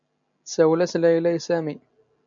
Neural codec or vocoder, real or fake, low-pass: none; real; 7.2 kHz